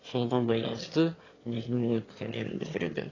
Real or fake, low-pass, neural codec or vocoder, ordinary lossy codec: fake; 7.2 kHz; autoencoder, 22.05 kHz, a latent of 192 numbers a frame, VITS, trained on one speaker; AAC, 32 kbps